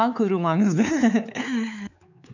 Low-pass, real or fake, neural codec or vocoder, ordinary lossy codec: 7.2 kHz; fake; codec, 16 kHz, 4 kbps, X-Codec, WavLM features, trained on Multilingual LibriSpeech; none